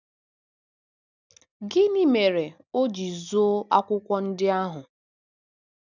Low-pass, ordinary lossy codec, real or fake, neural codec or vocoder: 7.2 kHz; none; real; none